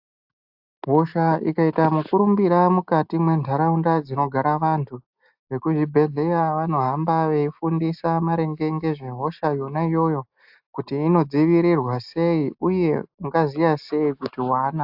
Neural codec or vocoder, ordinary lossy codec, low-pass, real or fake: none; AAC, 48 kbps; 5.4 kHz; real